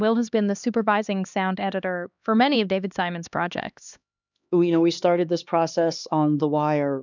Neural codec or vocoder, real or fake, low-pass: codec, 16 kHz, 2 kbps, X-Codec, HuBERT features, trained on LibriSpeech; fake; 7.2 kHz